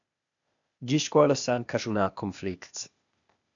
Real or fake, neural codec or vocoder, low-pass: fake; codec, 16 kHz, 0.8 kbps, ZipCodec; 7.2 kHz